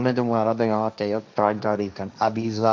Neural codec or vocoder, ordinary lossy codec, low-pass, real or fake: codec, 16 kHz, 1.1 kbps, Voila-Tokenizer; none; none; fake